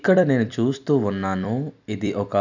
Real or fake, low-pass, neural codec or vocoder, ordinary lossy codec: fake; 7.2 kHz; vocoder, 44.1 kHz, 128 mel bands every 256 samples, BigVGAN v2; none